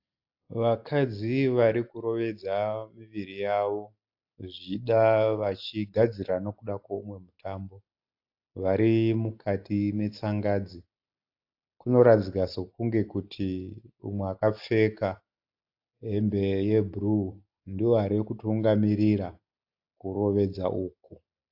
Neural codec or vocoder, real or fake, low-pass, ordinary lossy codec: none; real; 5.4 kHz; AAC, 48 kbps